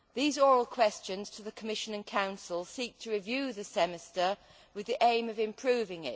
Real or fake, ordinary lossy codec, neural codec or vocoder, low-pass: real; none; none; none